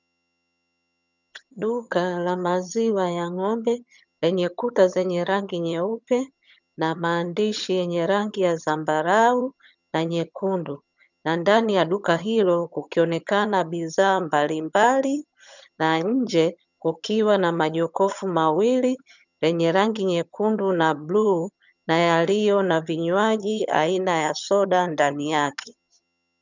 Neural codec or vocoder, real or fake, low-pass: vocoder, 22.05 kHz, 80 mel bands, HiFi-GAN; fake; 7.2 kHz